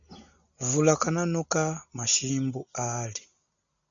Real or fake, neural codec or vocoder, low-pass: real; none; 7.2 kHz